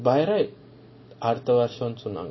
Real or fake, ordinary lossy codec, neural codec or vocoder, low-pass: real; MP3, 24 kbps; none; 7.2 kHz